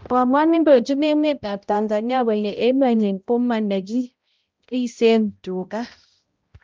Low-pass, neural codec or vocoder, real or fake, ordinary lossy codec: 7.2 kHz; codec, 16 kHz, 0.5 kbps, X-Codec, HuBERT features, trained on balanced general audio; fake; Opus, 24 kbps